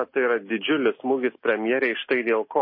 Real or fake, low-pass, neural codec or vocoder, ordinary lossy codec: real; 5.4 kHz; none; MP3, 24 kbps